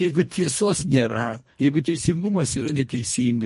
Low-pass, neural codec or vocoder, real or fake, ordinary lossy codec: 10.8 kHz; codec, 24 kHz, 1.5 kbps, HILCodec; fake; MP3, 48 kbps